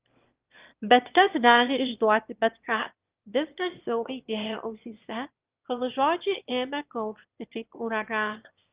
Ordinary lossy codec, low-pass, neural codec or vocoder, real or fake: Opus, 32 kbps; 3.6 kHz; autoencoder, 22.05 kHz, a latent of 192 numbers a frame, VITS, trained on one speaker; fake